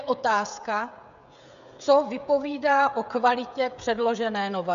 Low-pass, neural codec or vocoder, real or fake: 7.2 kHz; codec, 16 kHz, 16 kbps, FreqCodec, smaller model; fake